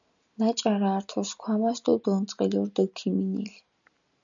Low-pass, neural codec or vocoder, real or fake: 7.2 kHz; none; real